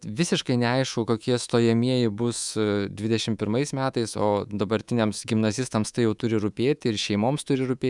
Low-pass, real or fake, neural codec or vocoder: 10.8 kHz; fake; codec, 24 kHz, 3.1 kbps, DualCodec